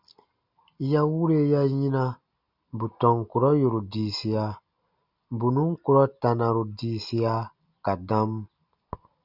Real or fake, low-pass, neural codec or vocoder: real; 5.4 kHz; none